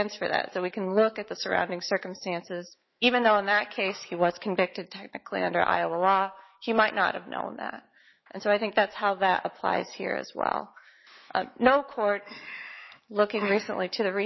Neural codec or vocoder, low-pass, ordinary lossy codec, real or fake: autoencoder, 48 kHz, 128 numbers a frame, DAC-VAE, trained on Japanese speech; 7.2 kHz; MP3, 24 kbps; fake